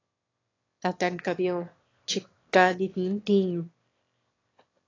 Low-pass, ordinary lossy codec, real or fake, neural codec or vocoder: 7.2 kHz; AAC, 32 kbps; fake; autoencoder, 22.05 kHz, a latent of 192 numbers a frame, VITS, trained on one speaker